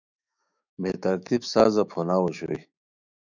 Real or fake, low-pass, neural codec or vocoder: fake; 7.2 kHz; autoencoder, 48 kHz, 128 numbers a frame, DAC-VAE, trained on Japanese speech